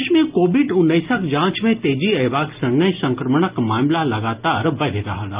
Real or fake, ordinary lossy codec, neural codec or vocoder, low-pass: real; Opus, 32 kbps; none; 3.6 kHz